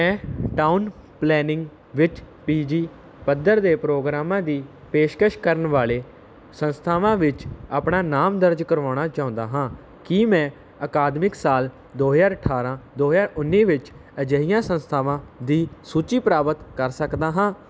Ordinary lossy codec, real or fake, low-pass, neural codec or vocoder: none; real; none; none